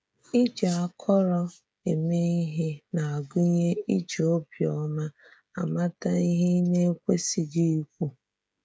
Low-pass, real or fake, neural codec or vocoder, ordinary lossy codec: none; fake; codec, 16 kHz, 16 kbps, FreqCodec, smaller model; none